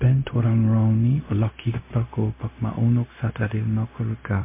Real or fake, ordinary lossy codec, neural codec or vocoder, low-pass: fake; MP3, 24 kbps; codec, 16 kHz, 0.4 kbps, LongCat-Audio-Codec; 3.6 kHz